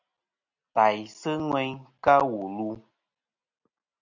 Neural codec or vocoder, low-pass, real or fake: none; 7.2 kHz; real